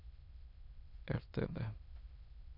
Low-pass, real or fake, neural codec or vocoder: 5.4 kHz; fake; autoencoder, 22.05 kHz, a latent of 192 numbers a frame, VITS, trained on many speakers